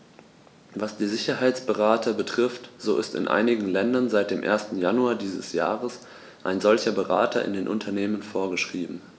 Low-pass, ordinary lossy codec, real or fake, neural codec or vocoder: none; none; real; none